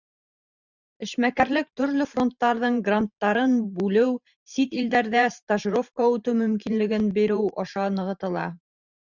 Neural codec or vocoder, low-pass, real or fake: vocoder, 44.1 kHz, 128 mel bands every 512 samples, BigVGAN v2; 7.2 kHz; fake